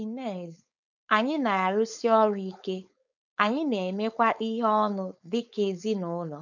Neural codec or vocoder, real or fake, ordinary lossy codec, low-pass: codec, 16 kHz, 4.8 kbps, FACodec; fake; none; 7.2 kHz